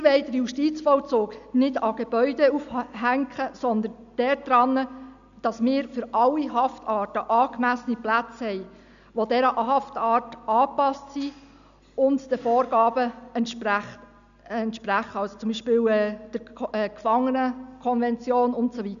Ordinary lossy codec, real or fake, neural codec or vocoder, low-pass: none; real; none; 7.2 kHz